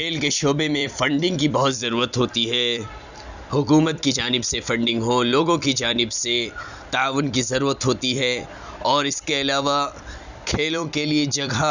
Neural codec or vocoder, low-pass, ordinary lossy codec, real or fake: vocoder, 44.1 kHz, 128 mel bands every 256 samples, BigVGAN v2; 7.2 kHz; none; fake